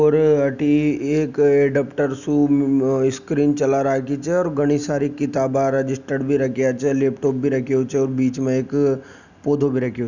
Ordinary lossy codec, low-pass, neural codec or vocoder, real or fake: none; 7.2 kHz; none; real